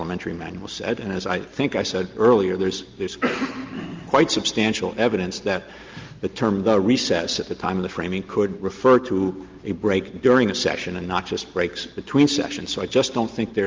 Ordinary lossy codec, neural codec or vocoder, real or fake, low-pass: Opus, 32 kbps; none; real; 7.2 kHz